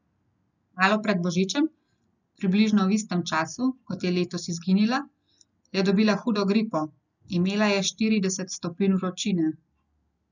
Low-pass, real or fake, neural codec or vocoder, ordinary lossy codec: 7.2 kHz; real; none; none